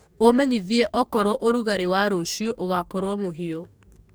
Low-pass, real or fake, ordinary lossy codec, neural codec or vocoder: none; fake; none; codec, 44.1 kHz, 2.6 kbps, DAC